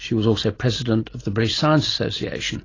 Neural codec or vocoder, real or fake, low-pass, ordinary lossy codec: none; real; 7.2 kHz; AAC, 32 kbps